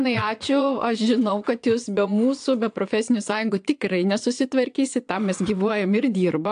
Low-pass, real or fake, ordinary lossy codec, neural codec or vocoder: 9.9 kHz; fake; AAC, 64 kbps; vocoder, 22.05 kHz, 80 mel bands, Vocos